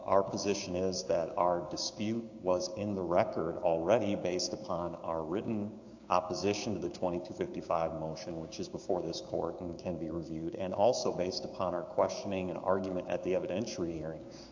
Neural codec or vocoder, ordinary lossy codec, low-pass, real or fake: codec, 16 kHz, 6 kbps, DAC; AAC, 48 kbps; 7.2 kHz; fake